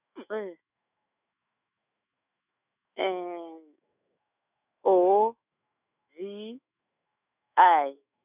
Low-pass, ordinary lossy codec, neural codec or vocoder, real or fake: 3.6 kHz; none; autoencoder, 48 kHz, 128 numbers a frame, DAC-VAE, trained on Japanese speech; fake